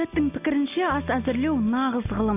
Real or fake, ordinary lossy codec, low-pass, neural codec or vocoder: real; none; 3.6 kHz; none